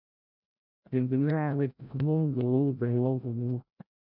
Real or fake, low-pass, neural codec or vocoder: fake; 5.4 kHz; codec, 16 kHz, 0.5 kbps, FreqCodec, larger model